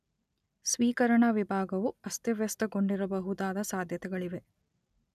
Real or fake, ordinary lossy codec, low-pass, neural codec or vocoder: real; none; 14.4 kHz; none